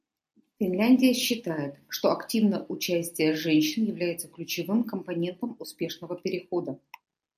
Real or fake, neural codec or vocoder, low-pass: real; none; 14.4 kHz